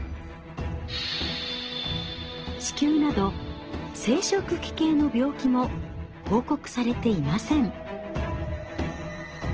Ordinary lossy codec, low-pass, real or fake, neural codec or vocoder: Opus, 16 kbps; 7.2 kHz; real; none